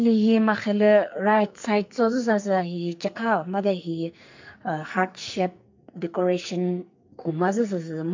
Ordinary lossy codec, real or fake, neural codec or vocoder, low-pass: MP3, 48 kbps; fake; codec, 44.1 kHz, 2.6 kbps, SNAC; 7.2 kHz